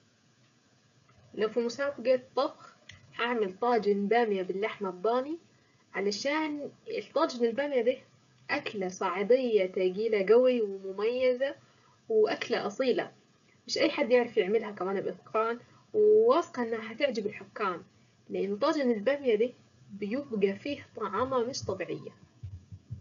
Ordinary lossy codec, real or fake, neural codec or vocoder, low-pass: none; fake; codec, 16 kHz, 16 kbps, FreqCodec, smaller model; 7.2 kHz